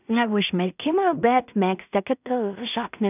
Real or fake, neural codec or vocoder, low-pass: fake; codec, 16 kHz in and 24 kHz out, 0.4 kbps, LongCat-Audio-Codec, two codebook decoder; 3.6 kHz